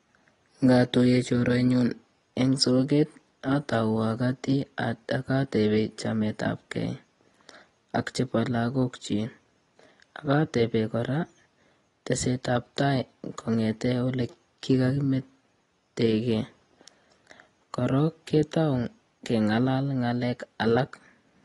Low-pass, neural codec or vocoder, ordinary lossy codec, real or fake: 10.8 kHz; none; AAC, 32 kbps; real